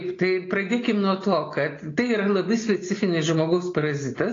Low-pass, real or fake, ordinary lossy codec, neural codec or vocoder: 7.2 kHz; real; AAC, 32 kbps; none